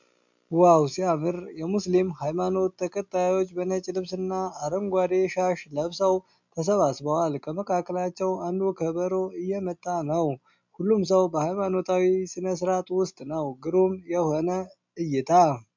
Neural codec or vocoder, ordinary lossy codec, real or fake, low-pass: none; MP3, 64 kbps; real; 7.2 kHz